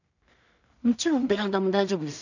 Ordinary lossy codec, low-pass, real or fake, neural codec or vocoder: none; 7.2 kHz; fake; codec, 16 kHz in and 24 kHz out, 0.4 kbps, LongCat-Audio-Codec, two codebook decoder